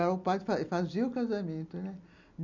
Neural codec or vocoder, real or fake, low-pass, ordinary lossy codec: none; real; 7.2 kHz; none